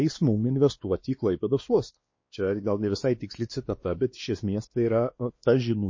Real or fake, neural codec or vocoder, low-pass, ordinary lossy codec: fake; codec, 16 kHz, 2 kbps, X-Codec, WavLM features, trained on Multilingual LibriSpeech; 7.2 kHz; MP3, 32 kbps